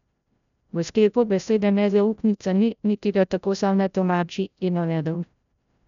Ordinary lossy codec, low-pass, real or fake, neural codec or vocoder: none; 7.2 kHz; fake; codec, 16 kHz, 0.5 kbps, FreqCodec, larger model